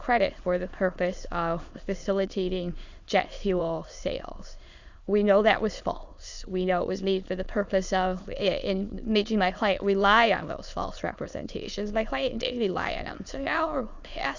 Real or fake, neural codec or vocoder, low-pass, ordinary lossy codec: fake; autoencoder, 22.05 kHz, a latent of 192 numbers a frame, VITS, trained on many speakers; 7.2 kHz; Opus, 64 kbps